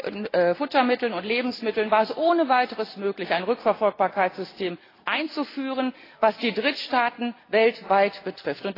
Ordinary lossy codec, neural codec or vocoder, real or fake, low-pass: AAC, 24 kbps; none; real; 5.4 kHz